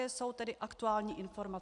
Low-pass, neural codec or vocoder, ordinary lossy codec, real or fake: 10.8 kHz; none; AAC, 64 kbps; real